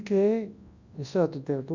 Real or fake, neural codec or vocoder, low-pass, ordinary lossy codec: fake; codec, 24 kHz, 0.9 kbps, WavTokenizer, large speech release; 7.2 kHz; Opus, 64 kbps